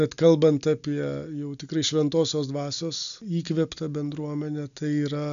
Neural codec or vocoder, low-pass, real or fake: none; 7.2 kHz; real